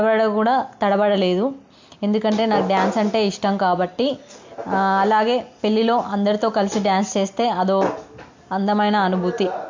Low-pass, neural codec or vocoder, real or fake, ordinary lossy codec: 7.2 kHz; none; real; MP3, 48 kbps